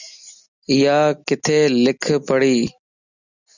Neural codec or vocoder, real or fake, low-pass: none; real; 7.2 kHz